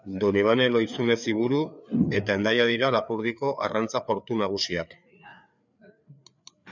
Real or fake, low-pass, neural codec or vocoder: fake; 7.2 kHz; codec, 16 kHz, 4 kbps, FreqCodec, larger model